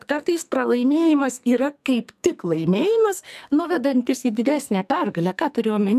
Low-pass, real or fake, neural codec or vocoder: 14.4 kHz; fake; codec, 44.1 kHz, 2.6 kbps, SNAC